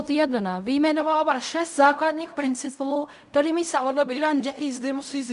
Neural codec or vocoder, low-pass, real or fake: codec, 16 kHz in and 24 kHz out, 0.4 kbps, LongCat-Audio-Codec, fine tuned four codebook decoder; 10.8 kHz; fake